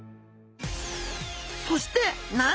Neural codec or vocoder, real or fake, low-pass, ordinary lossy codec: none; real; none; none